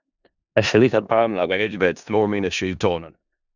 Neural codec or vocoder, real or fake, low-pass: codec, 16 kHz in and 24 kHz out, 0.4 kbps, LongCat-Audio-Codec, four codebook decoder; fake; 7.2 kHz